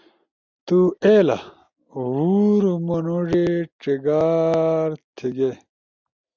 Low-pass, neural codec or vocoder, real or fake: 7.2 kHz; none; real